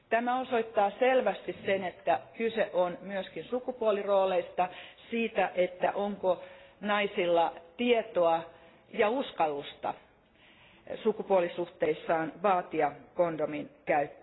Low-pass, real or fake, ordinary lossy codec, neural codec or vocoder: 7.2 kHz; real; AAC, 16 kbps; none